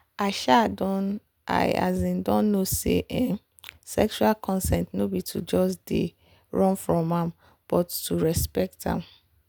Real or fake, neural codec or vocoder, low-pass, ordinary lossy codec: real; none; none; none